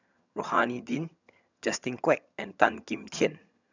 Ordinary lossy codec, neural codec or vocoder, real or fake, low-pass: none; vocoder, 22.05 kHz, 80 mel bands, HiFi-GAN; fake; 7.2 kHz